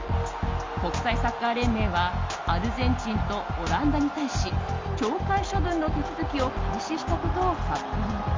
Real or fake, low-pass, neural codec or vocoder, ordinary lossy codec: real; 7.2 kHz; none; Opus, 32 kbps